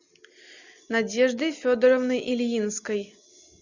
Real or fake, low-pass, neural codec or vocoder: real; 7.2 kHz; none